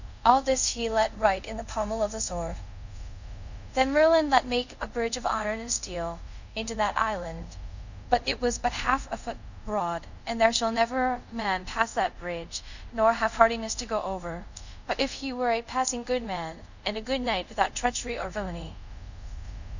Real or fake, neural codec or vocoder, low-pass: fake; codec, 24 kHz, 0.5 kbps, DualCodec; 7.2 kHz